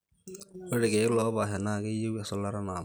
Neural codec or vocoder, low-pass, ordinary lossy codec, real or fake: none; none; none; real